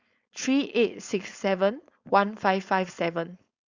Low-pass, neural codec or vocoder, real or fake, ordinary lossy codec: 7.2 kHz; codec, 16 kHz, 4.8 kbps, FACodec; fake; Opus, 64 kbps